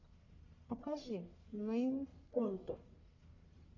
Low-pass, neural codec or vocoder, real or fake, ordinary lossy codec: 7.2 kHz; codec, 44.1 kHz, 1.7 kbps, Pupu-Codec; fake; MP3, 64 kbps